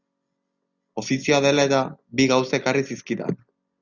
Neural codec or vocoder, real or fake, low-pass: none; real; 7.2 kHz